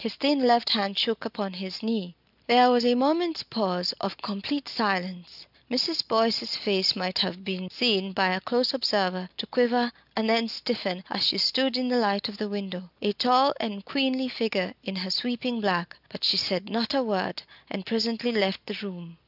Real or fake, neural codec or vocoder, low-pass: real; none; 5.4 kHz